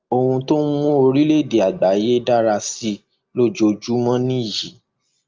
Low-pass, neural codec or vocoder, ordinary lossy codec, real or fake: 7.2 kHz; none; Opus, 24 kbps; real